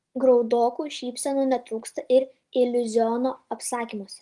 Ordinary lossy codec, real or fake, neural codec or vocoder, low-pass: Opus, 24 kbps; real; none; 10.8 kHz